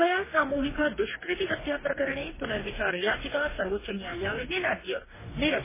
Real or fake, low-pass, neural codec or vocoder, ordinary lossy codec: fake; 3.6 kHz; codec, 44.1 kHz, 2.6 kbps, DAC; MP3, 16 kbps